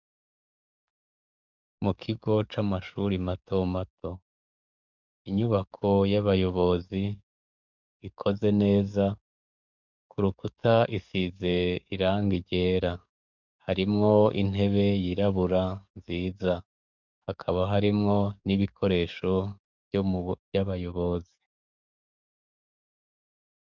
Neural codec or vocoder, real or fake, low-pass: codec, 44.1 kHz, 7.8 kbps, DAC; fake; 7.2 kHz